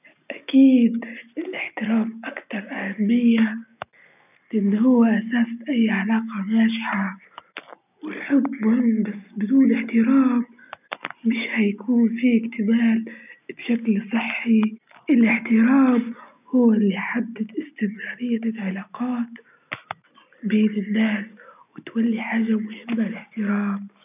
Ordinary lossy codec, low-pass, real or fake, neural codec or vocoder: none; 3.6 kHz; real; none